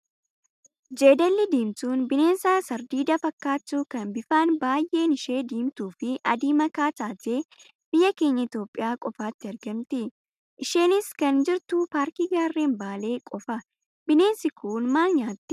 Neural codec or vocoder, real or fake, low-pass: none; real; 14.4 kHz